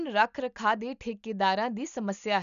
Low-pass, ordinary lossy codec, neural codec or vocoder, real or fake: 7.2 kHz; none; none; real